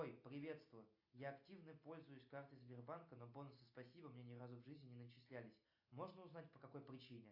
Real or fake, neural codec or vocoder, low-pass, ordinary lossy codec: real; none; 5.4 kHz; AAC, 48 kbps